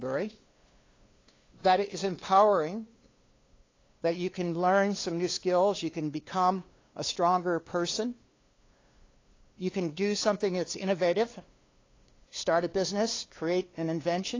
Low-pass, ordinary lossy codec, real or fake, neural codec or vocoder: 7.2 kHz; AAC, 32 kbps; fake; codec, 16 kHz, 2 kbps, FunCodec, trained on LibriTTS, 25 frames a second